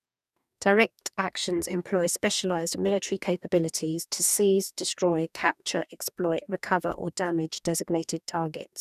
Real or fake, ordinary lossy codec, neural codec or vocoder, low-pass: fake; none; codec, 44.1 kHz, 2.6 kbps, DAC; 14.4 kHz